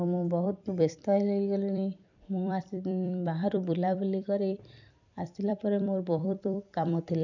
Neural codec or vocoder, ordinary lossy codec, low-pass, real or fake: vocoder, 44.1 kHz, 128 mel bands every 256 samples, BigVGAN v2; none; 7.2 kHz; fake